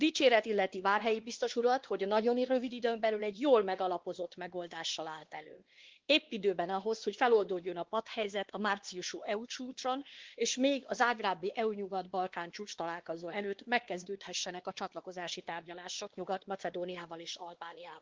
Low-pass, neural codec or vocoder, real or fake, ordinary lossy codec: 7.2 kHz; codec, 16 kHz, 2 kbps, X-Codec, WavLM features, trained on Multilingual LibriSpeech; fake; Opus, 16 kbps